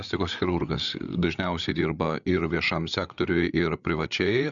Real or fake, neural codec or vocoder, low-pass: fake; codec, 16 kHz, 8 kbps, FreqCodec, larger model; 7.2 kHz